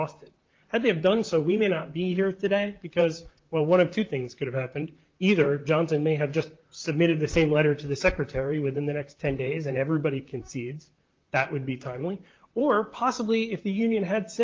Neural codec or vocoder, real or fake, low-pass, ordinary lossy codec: vocoder, 44.1 kHz, 128 mel bands, Pupu-Vocoder; fake; 7.2 kHz; Opus, 32 kbps